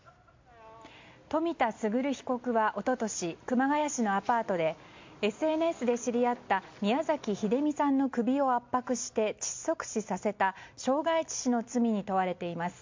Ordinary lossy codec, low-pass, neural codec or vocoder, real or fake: MP3, 48 kbps; 7.2 kHz; none; real